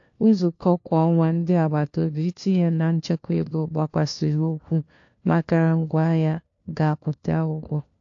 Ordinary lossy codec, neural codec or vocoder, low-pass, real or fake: AAC, 48 kbps; codec, 16 kHz, 1 kbps, FunCodec, trained on LibriTTS, 50 frames a second; 7.2 kHz; fake